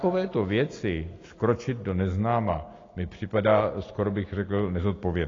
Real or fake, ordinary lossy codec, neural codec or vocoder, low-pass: real; AAC, 32 kbps; none; 7.2 kHz